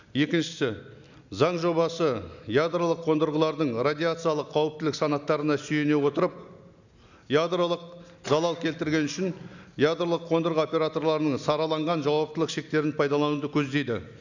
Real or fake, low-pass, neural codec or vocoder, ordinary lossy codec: real; 7.2 kHz; none; none